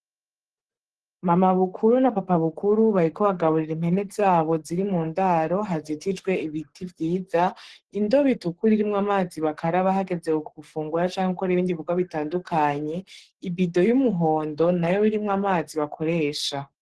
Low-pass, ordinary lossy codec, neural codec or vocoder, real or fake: 10.8 kHz; Opus, 16 kbps; codec, 44.1 kHz, 7.8 kbps, Pupu-Codec; fake